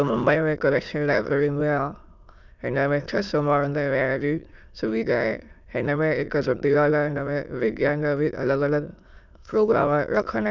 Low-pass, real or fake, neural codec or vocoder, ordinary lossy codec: 7.2 kHz; fake; autoencoder, 22.05 kHz, a latent of 192 numbers a frame, VITS, trained on many speakers; none